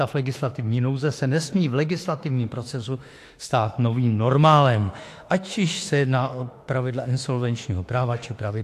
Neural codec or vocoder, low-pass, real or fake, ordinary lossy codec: autoencoder, 48 kHz, 32 numbers a frame, DAC-VAE, trained on Japanese speech; 14.4 kHz; fake; AAC, 64 kbps